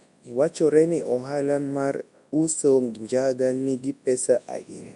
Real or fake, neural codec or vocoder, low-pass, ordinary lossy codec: fake; codec, 24 kHz, 0.9 kbps, WavTokenizer, large speech release; 10.8 kHz; MP3, 64 kbps